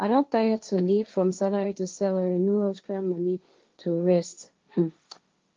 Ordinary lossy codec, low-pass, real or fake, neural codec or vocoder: Opus, 32 kbps; 7.2 kHz; fake; codec, 16 kHz, 1.1 kbps, Voila-Tokenizer